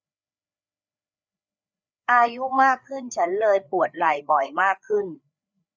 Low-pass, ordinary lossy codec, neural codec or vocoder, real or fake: none; none; codec, 16 kHz, 4 kbps, FreqCodec, larger model; fake